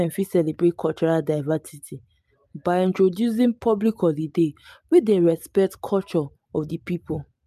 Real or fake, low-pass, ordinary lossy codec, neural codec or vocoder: real; 14.4 kHz; MP3, 96 kbps; none